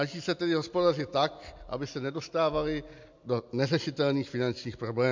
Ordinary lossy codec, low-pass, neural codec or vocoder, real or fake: MP3, 64 kbps; 7.2 kHz; none; real